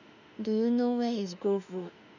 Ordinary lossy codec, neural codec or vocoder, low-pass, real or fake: none; autoencoder, 48 kHz, 32 numbers a frame, DAC-VAE, trained on Japanese speech; 7.2 kHz; fake